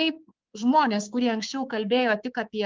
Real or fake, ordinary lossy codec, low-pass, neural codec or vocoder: fake; Opus, 24 kbps; 7.2 kHz; codec, 24 kHz, 3.1 kbps, DualCodec